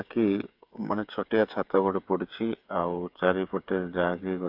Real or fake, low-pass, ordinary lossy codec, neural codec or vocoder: fake; 5.4 kHz; AAC, 48 kbps; codec, 16 kHz, 8 kbps, FreqCodec, smaller model